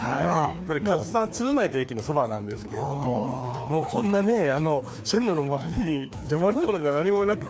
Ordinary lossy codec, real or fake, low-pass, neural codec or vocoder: none; fake; none; codec, 16 kHz, 2 kbps, FreqCodec, larger model